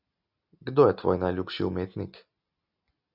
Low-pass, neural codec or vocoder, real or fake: 5.4 kHz; none; real